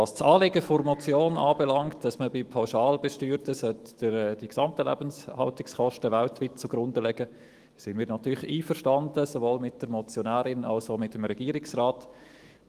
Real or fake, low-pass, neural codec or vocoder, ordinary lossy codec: fake; 14.4 kHz; autoencoder, 48 kHz, 128 numbers a frame, DAC-VAE, trained on Japanese speech; Opus, 24 kbps